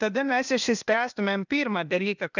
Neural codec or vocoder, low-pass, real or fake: codec, 16 kHz, 0.8 kbps, ZipCodec; 7.2 kHz; fake